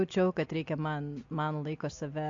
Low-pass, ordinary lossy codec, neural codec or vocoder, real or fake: 7.2 kHz; AAC, 48 kbps; none; real